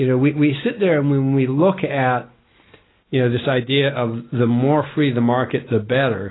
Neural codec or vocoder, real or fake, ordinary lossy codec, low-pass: none; real; AAC, 16 kbps; 7.2 kHz